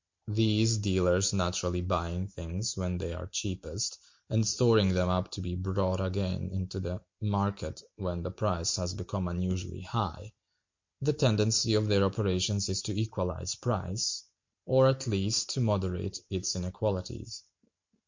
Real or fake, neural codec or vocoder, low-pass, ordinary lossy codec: real; none; 7.2 kHz; MP3, 48 kbps